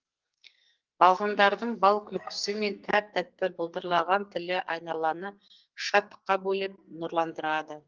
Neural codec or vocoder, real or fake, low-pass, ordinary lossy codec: codec, 44.1 kHz, 2.6 kbps, SNAC; fake; 7.2 kHz; Opus, 32 kbps